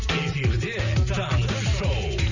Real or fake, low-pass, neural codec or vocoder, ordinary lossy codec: real; 7.2 kHz; none; none